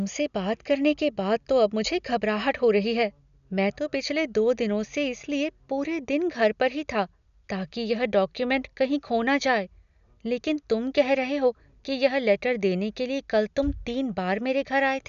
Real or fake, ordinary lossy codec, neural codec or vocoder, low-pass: real; none; none; 7.2 kHz